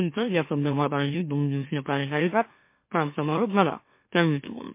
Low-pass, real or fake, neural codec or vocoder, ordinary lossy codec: 3.6 kHz; fake; autoencoder, 44.1 kHz, a latent of 192 numbers a frame, MeloTTS; MP3, 24 kbps